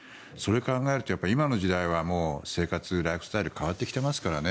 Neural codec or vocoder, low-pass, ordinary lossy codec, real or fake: none; none; none; real